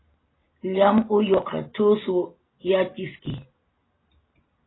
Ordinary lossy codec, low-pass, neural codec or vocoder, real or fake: AAC, 16 kbps; 7.2 kHz; none; real